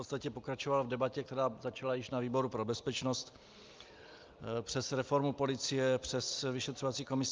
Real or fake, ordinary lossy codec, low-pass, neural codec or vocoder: real; Opus, 24 kbps; 7.2 kHz; none